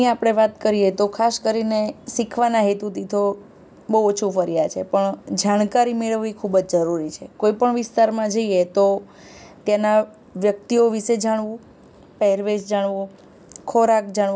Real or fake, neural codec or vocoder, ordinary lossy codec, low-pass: real; none; none; none